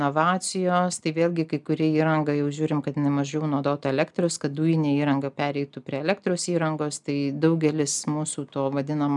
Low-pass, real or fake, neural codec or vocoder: 10.8 kHz; real; none